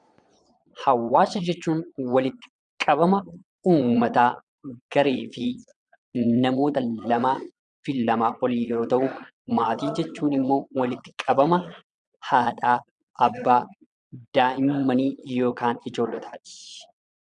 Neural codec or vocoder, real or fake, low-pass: vocoder, 22.05 kHz, 80 mel bands, Vocos; fake; 9.9 kHz